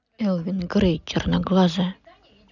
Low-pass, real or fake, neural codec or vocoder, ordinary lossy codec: 7.2 kHz; real; none; none